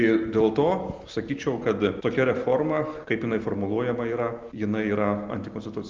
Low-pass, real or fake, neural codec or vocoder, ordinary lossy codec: 7.2 kHz; real; none; Opus, 32 kbps